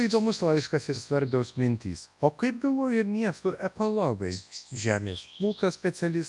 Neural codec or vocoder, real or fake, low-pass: codec, 24 kHz, 0.9 kbps, WavTokenizer, large speech release; fake; 10.8 kHz